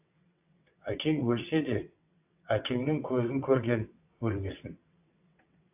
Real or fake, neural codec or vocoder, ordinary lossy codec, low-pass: fake; vocoder, 22.05 kHz, 80 mel bands, WaveNeXt; AAC, 32 kbps; 3.6 kHz